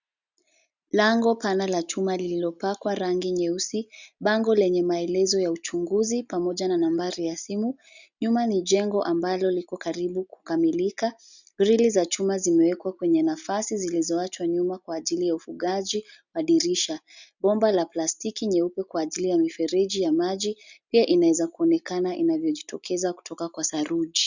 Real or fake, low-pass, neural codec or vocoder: real; 7.2 kHz; none